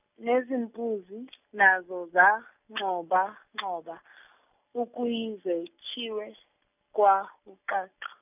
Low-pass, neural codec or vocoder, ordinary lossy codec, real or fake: 3.6 kHz; none; none; real